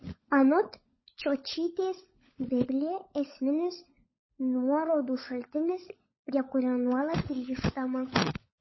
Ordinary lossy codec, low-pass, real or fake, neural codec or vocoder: MP3, 24 kbps; 7.2 kHz; fake; codec, 16 kHz, 16 kbps, FunCodec, trained on LibriTTS, 50 frames a second